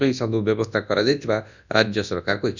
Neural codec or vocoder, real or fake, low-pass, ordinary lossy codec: codec, 24 kHz, 0.9 kbps, WavTokenizer, large speech release; fake; 7.2 kHz; none